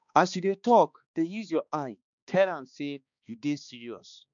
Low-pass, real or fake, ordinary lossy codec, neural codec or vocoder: 7.2 kHz; fake; none; codec, 16 kHz, 2 kbps, X-Codec, HuBERT features, trained on balanced general audio